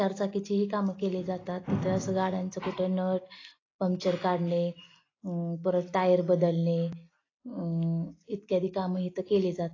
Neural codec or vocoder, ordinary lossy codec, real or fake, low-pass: none; AAC, 32 kbps; real; 7.2 kHz